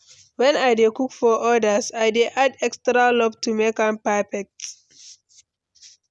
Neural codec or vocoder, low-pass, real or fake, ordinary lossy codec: none; none; real; none